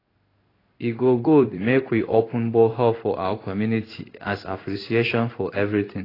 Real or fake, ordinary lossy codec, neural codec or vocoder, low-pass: fake; AAC, 24 kbps; codec, 16 kHz in and 24 kHz out, 1 kbps, XY-Tokenizer; 5.4 kHz